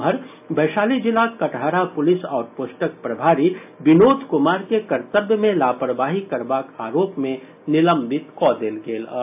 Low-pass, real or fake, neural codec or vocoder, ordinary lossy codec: 3.6 kHz; real; none; AAC, 32 kbps